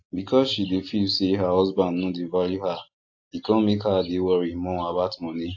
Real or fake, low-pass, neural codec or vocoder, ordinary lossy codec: real; 7.2 kHz; none; AAC, 48 kbps